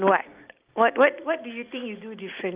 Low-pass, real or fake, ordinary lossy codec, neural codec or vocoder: 3.6 kHz; real; Opus, 32 kbps; none